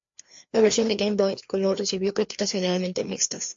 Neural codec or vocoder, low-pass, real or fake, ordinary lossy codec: codec, 16 kHz, 2 kbps, FreqCodec, larger model; 7.2 kHz; fake; MP3, 48 kbps